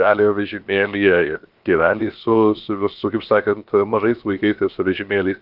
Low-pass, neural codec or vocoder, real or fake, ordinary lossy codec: 5.4 kHz; codec, 16 kHz, 0.7 kbps, FocalCodec; fake; Opus, 32 kbps